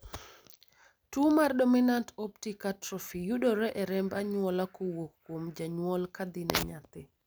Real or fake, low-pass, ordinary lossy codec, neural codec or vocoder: real; none; none; none